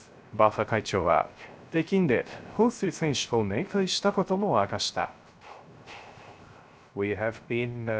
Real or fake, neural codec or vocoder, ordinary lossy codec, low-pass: fake; codec, 16 kHz, 0.3 kbps, FocalCodec; none; none